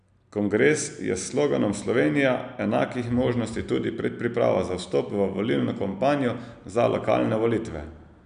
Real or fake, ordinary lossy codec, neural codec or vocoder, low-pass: real; none; none; 9.9 kHz